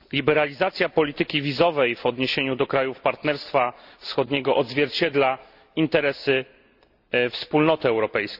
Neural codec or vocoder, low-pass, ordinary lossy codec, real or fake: none; 5.4 kHz; Opus, 64 kbps; real